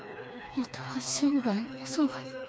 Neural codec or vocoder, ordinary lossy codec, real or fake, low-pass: codec, 16 kHz, 2 kbps, FreqCodec, smaller model; none; fake; none